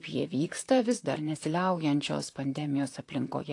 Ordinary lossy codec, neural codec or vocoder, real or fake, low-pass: AAC, 48 kbps; vocoder, 44.1 kHz, 128 mel bands, Pupu-Vocoder; fake; 10.8 kHz